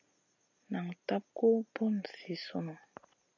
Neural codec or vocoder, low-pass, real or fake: none; 7.2 kHz; real